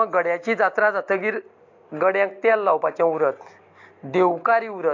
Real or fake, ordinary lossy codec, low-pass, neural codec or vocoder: real; AAC, 48 kbps; 7.2 kHz; none